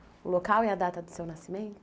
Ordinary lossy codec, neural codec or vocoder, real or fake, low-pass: none; none; real; none